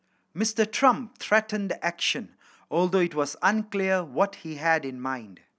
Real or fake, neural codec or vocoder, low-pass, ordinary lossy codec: real; none; none; none